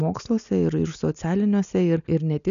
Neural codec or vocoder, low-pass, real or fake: none; 7.2 kHz; real